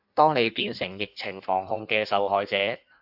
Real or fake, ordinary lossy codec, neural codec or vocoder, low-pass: fake; AAC, 48 kbps; codec, 16 kHz in and 24 kHz out, 1.1 kbps, FireRedTTS-2 codec; 5.4 kHz